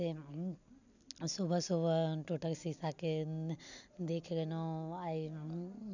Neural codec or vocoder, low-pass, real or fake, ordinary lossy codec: none; 7.2 kHz; real; none